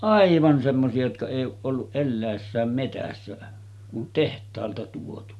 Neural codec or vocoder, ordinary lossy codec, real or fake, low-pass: none; none; real; none